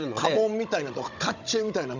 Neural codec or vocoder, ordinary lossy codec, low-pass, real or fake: codec, 16 kHz, 16 kbps, FunCodec, trained on Chinese and English, 50 frames a second; none; 7.2 kHz; fake